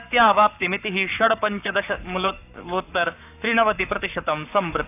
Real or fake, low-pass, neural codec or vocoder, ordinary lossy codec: fake; 3.6 kHz; codec, 44.1 kHz, 7.8 kbps, Pupu-Codec; none